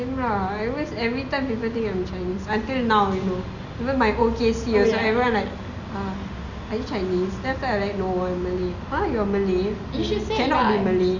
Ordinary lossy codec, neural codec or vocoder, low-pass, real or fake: none; none; 7.2 kHz; real